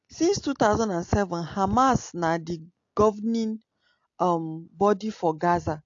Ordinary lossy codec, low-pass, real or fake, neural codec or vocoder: AAC, 48 kbps; 7.2 kHz; real; none